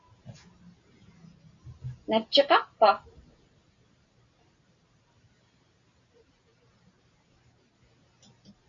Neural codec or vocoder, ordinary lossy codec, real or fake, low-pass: none; MP3, 48 kbps; real; 7.2 kHz